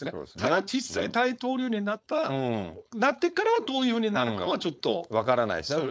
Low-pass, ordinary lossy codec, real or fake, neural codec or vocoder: none; none; fake; codec, 16 kHz, 4.8 kbps, FACodec